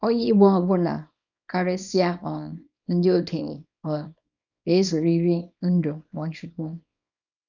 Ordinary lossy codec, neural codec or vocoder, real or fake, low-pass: none; codec, 24 kHz, 0.9 kbps, WavTokenizer, small release; fake; 7.2 kHz